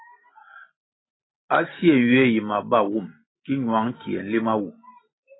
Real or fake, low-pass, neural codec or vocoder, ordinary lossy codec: real; 7.2 kHz; none; AAC, 16 kbps